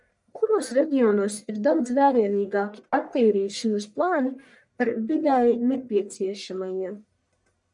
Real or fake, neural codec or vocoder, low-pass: fake; codec, 44.1 kHz, 1.7 kbps, Pupu-Codec; 10.8 kHz